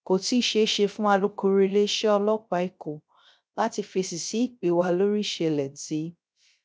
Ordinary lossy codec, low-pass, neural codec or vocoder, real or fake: none; none; codec, 16 kHz, 0.3 kbps, FocalCodec; fake